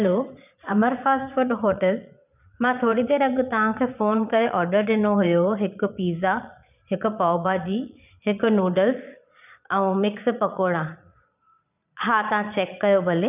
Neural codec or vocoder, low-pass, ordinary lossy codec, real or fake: vocoder, 22.05 kHz, 80 mel bands, Vocos; 3.6 kHz; none; fake